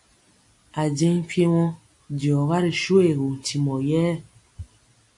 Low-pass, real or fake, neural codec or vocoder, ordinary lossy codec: 10.8 kHz; real; none; AAC, 64 kbps